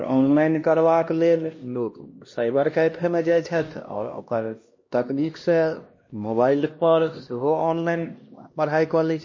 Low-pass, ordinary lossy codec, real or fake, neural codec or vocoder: 7.2 kHz; MP3, 32 kbps; fake; codec, 16 kHz, 1 kbps, X-Codec, HuBERT features, trained on LibriSpeech